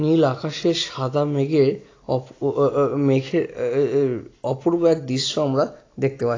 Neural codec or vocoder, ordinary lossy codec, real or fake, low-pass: none; AAC, 32 kbps; real; 7.2 kHz